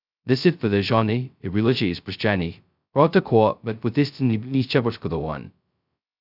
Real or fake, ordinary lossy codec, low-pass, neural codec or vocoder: fake; none; 5.4 kHz; codec, 16 kHz, 0.2 kbps, FocalCodec